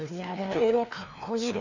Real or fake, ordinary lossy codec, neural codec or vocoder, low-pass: fake; none; codec, 16 kHz, 2 kbps, FreqCodec, larger model; 7.2 kHz